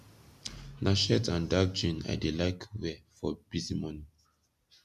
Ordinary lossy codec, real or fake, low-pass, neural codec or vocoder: none; real; 14.4 kHz; none